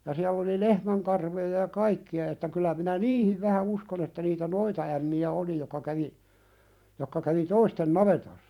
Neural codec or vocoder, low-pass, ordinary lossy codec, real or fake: vocoder, 44.1 kHz, 128 mel bands every 512 samples, BigVGAN v2; 19.8 kHz; none; fake